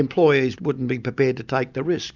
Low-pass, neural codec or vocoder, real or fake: 7.2 kHz; none; real